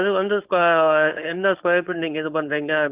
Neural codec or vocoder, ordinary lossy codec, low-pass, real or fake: codec, 16 kHz, 4.8 kbps, FACodec; Opus, 32 kbps; 3.6 kHz; fake